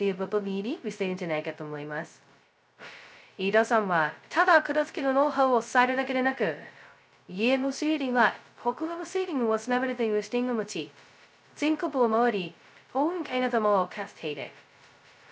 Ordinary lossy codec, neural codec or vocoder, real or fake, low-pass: none; codec, 16 kHz, 0.2 kbps, FocalCodec; fake; none